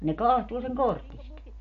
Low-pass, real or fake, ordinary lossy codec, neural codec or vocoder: 7.2 kHz; real; MP3, 48 kbps; none